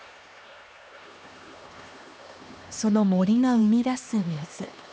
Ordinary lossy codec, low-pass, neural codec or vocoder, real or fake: none; none; codec, 16 kHz, 2 kbps, X-Codec, HuBERT features, trained on LibriSpeech; fake